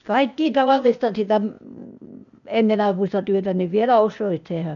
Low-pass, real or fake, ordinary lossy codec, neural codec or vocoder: 7.2 kHz; fake; none; codec, 16 kHz, 0.8 kbps, ZipCodec